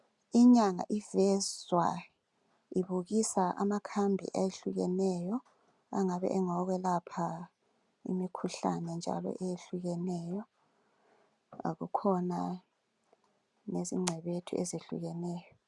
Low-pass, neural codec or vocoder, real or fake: 10.8 kHz; none; real